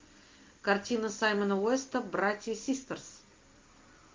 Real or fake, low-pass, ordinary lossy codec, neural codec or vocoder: real; 7.2 kHz; Opus, 24 kbps; none